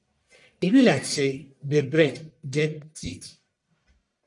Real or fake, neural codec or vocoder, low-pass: fake; codec, 44.1 kHz, 1.7 kbps, Pupu-Codec; 10.8 kHz